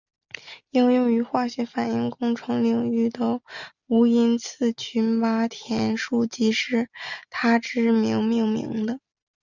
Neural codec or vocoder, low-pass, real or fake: none; 7.2 kHz; real